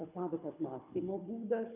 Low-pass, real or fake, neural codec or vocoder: 3.6 kHz; fake; codec, 24 kHz, 6 kbps, HILCodec